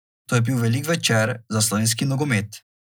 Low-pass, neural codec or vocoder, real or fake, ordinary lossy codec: none; none; real; none